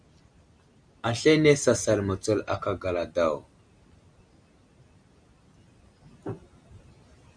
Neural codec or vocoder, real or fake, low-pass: none; real; 9.9 kHz